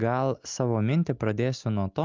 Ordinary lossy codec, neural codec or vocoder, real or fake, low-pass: Opus, 24 kbps; none; real; 7.2 kHz